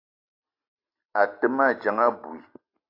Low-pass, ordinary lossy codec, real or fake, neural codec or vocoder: 5.4 kHz; AAC, 32 kbps; real; none